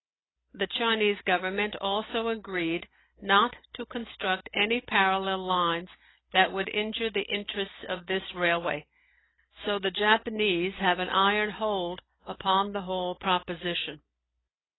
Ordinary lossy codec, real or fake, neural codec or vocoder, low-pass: AAC, 16 kbps; real; none; 7.2 kHz